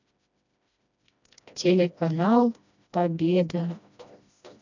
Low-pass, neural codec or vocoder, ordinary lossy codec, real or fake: 7.2 kHz; codec, 16 kHz, 1 kbps, FreqCodec, smaller model; none; fake